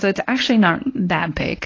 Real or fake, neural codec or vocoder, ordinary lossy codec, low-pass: fake; codec, 24 kHz, 0.9 kbps, WavTokenizer, medium speech release version 1; AAC, 32 kbps; 7.2 kHz